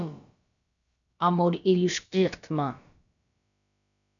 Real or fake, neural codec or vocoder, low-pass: fake; codec, 16 kHz, about 1 kbps, DyCAST, with the encoder's durations; 7.2 kHz